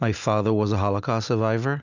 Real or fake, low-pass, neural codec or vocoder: real; 7.2 kHz; none